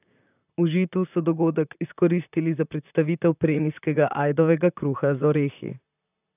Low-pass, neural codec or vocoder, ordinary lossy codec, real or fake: 3.6 kHz; vocoder, 44.1 kHz, 128 mel bands, Pupu-Vocoder; none; fake